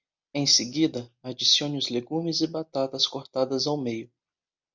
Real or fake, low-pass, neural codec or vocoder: real; 7.2 kHz; none